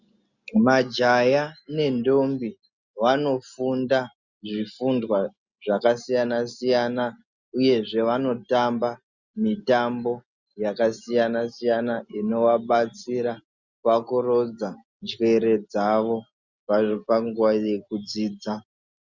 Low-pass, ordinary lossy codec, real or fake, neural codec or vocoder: 7.2 kHz; Opus, 64 kbps; real; none